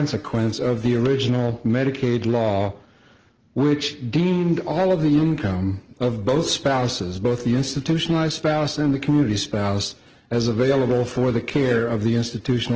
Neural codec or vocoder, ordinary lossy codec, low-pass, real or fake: none; Opus, 16 kbps; 7.2 kHz; real